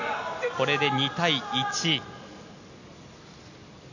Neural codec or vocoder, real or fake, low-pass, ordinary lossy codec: none; real; 7.2 kHz; none